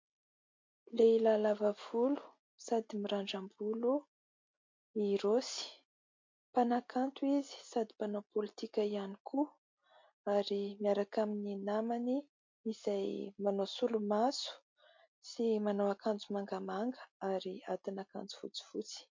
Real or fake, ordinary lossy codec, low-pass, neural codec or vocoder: real; MP3, 48 kbps; 7.2 kHz; none